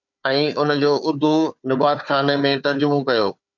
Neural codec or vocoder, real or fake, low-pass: codec, 16 kHz, 4 kbps, FunCodec, trained on Chinese and English, 50 frames a second; fake; 7.2 kHz